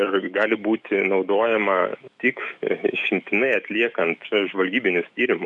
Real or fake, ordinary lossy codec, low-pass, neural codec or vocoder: fake; MP3, 96 kbps; 10.8 kHz; autoencoder, 48 kHz, 128 numbers a frame, DAC-VAE, trained on Japanese speech